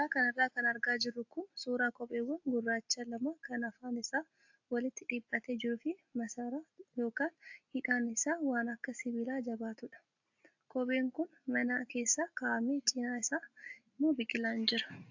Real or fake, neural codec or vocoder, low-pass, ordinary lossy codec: real; none; 7.2 kHz; AAC, 48 kbps